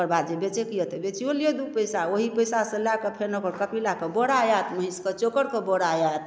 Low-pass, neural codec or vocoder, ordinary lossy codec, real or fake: none; none; none; real